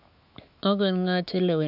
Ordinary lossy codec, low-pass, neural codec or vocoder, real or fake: none; 5.4 kHz; autoencoder, 48 kHz, 128 numbers a frame, DAC-VAE, trained on Japanese speech; fake